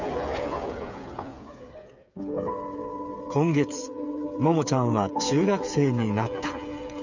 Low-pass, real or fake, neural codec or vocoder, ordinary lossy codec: 7.2 kHz; fake; codec, 16 kHz, 8 kbps, FreqCodec, smaller model; none